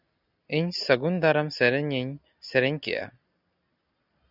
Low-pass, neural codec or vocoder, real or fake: 5.4 kHz; none; real